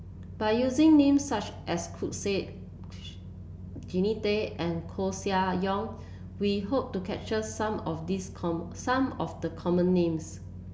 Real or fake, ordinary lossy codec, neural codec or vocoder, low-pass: real; none; none; none